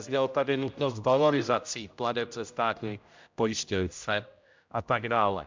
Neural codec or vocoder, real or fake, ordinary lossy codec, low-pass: codec, 16 kHz, 0.5 kbps, X-Codec, HuBERT features, trained on general audio; fake; MP3, 64 kbps; 7.2 kHz